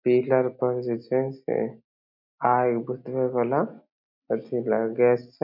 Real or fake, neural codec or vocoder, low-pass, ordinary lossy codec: real; none; 5.4 kHz; none